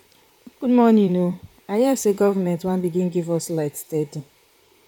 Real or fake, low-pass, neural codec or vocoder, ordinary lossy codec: fake; 19.8 kHz; vocoder, 44.1 kHz, 128 mel bands, Pupu-Vocoder; none